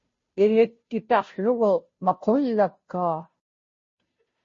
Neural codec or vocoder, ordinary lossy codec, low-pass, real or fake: codec, 16 kHz, 0.5 kbps, FunCodec, trained on Chinese and English, 25 frames a second; MP3, 32 kbps; 7.2 kHz; fake